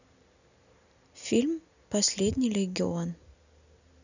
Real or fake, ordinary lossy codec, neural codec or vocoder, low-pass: real; none; none; 7.2 kHz